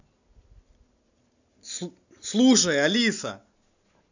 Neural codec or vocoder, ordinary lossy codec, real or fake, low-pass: none; none; real; 7.2 kHz